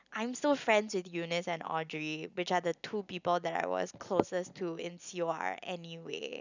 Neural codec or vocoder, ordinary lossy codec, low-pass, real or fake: none; none; 7.2 kHz; real